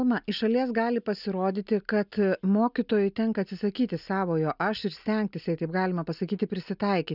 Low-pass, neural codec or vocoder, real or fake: 5.4 kHz; none; real